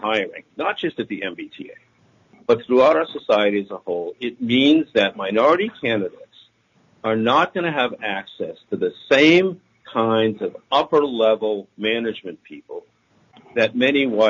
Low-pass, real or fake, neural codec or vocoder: 7.2 kHz; real; none